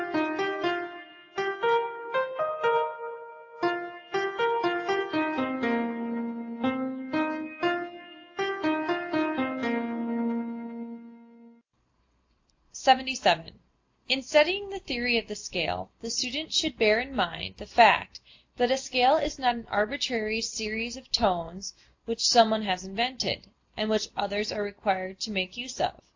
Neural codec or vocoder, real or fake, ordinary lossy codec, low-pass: none; real; AAC, 48 kbps; 7.2 kHz